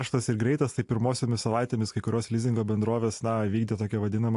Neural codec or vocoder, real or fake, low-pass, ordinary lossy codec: none; real; 10.8 kHz; AAC, 64 kbps